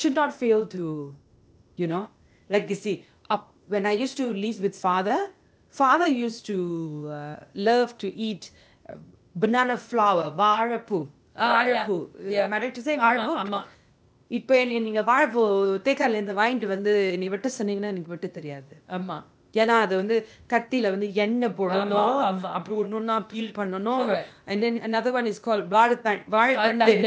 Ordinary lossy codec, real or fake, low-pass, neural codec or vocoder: none; fake; none; codec, 16 kHz, 0.8 kbps, ZipCodec